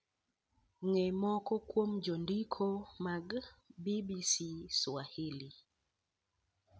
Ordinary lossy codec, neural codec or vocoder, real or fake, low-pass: none; none; real; none